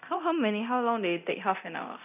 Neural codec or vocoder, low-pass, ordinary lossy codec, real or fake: codec, 24 kHz, 0.9 kbps, DualCodec; 3.6 kHz; none; fake